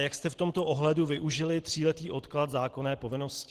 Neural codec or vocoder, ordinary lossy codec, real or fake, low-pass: none; Opus, 16 kbps; real; 14.4 kHz